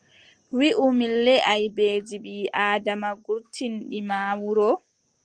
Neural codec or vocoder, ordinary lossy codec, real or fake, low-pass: none; Opus, 24 kbps; real; 9.9 kHz